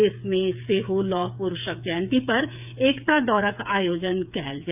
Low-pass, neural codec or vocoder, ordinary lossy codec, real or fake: 3.6 kHz; codec, 16 kHz, 8 kbps, FreqCodec, smaller model; none; fake